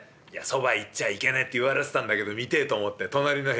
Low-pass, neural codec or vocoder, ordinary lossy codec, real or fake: none; none; none; real